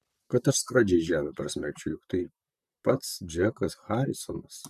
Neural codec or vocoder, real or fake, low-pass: vocoder, 44.1 kHz, 128 mel bands, Pupu-Vocoder; fake; 14.4 kHz